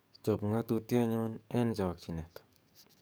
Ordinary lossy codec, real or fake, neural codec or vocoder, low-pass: none; fake; codec, 44.1 kHz, 7.8 kbps, DAC; none